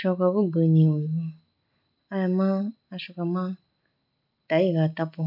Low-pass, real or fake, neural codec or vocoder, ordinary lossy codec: 5.4 kHz; real; none; none